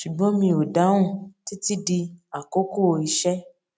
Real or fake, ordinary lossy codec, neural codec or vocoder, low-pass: real; none; none; none